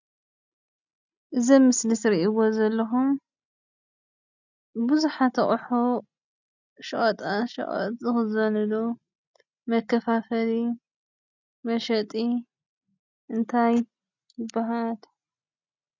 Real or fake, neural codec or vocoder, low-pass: real; none; 7.2 kHz